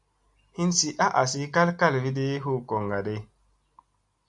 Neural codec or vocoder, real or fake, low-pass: none; real; 10.8 kHz